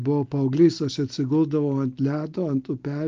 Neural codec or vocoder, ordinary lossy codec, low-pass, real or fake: none; Opus, 24 kbps; 7.2 kHz; real